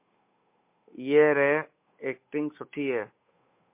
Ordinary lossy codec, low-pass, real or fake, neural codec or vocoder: MP3, 24 kbps; 3.6 kHz; fake; codec, 16 kHz, 8 kbps, FunCodec, trained on Chinese and English, 25 frames a second